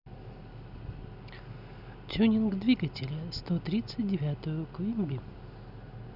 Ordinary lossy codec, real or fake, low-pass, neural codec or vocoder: none; real; 5.4 kHz; none